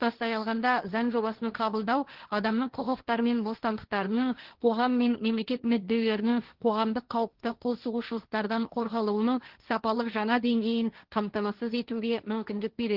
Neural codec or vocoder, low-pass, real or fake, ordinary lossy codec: codec, 16 kHz, 1.1 kbps, Voila-Tokenizer; 5.4 kHz; fake; Opus, 16 kbps